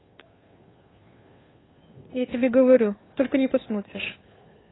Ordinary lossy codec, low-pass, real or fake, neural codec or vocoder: AAC, 16 kbps; 7.2 kHz; fake; codec, 16 kHz, 2 kbps, FunCodec, trained on Chinese and English, 25 frames a second